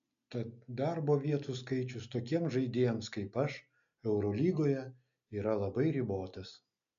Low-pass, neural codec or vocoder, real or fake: 7.2 kHz; none; real